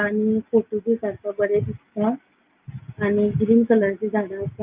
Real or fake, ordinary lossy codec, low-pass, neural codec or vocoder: real; Opus, 32 kbps; 3.6 kHz; none